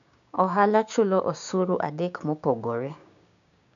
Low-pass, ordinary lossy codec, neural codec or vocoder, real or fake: 7.2 kHz; none; codec, 16 kHz, 6 kbps, DAC; fake